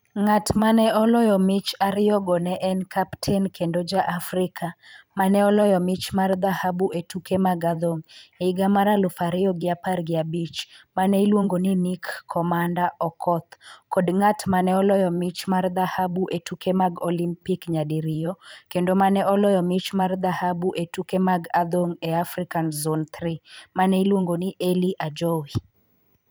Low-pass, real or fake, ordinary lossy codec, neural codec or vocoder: none; fake; none; vocoder, 44.1 kHz, 128 mel bands every 512 samples, BigVGAN v2